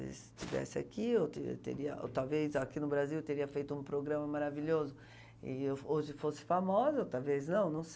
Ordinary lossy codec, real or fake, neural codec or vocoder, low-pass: none; real; none; none